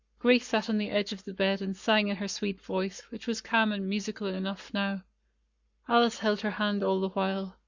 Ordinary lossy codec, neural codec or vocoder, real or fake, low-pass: Opus, 64 kbps; codec, 44.1 kHz, 7.8 kbps, Pupu-Codec; fake; 7.2 kHz